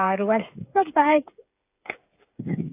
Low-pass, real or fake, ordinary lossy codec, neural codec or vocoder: 3.6 kHz; fake; AAC, 24 kbps; codec, 16 kHz, 8 kbps, FreqCodec, smaller model